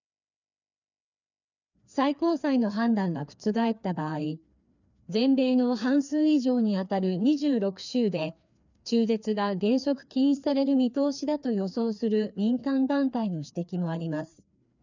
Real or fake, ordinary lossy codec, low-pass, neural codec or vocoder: fake; none; 7.2 kHz; codec, 16 kHz, 2 kbps, FreqCodec, larger model